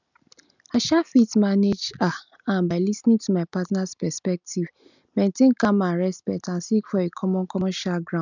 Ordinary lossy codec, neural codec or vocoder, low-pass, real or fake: none; none; 7.2 kHz; real